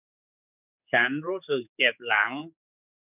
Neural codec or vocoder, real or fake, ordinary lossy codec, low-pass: codec, 16 kHz, 4 kbps, X-Codec, HuBERT features, trained on general audio; fake; none; 3.6 kHz